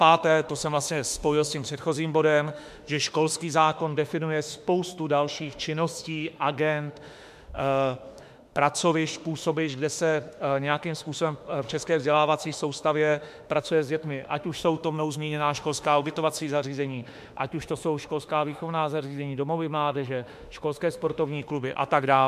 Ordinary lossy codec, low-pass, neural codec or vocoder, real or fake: MP3, 96 kbps; 14.4 kHz; autoencoder, 48 kHz, 32 numbers a frame, DAC-VAE, trained on Japanese speech; fake